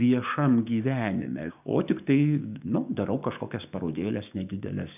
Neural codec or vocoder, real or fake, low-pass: codec, 16 kHz, 6 kbps, DAC; fake; 3.6 kHz